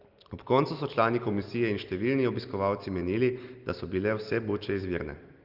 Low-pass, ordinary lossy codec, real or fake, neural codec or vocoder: 5.4 kHz; Opus, 32 kbps; real; none